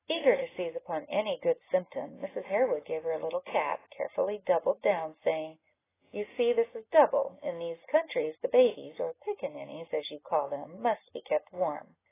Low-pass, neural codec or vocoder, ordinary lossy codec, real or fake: 3.6 kHz; none; AAC, 16 kbps; real